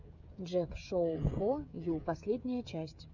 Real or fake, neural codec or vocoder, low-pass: fake; codec, 16 kHz, 16 kbps, FreqCodec, smaller model; 7.2 kHz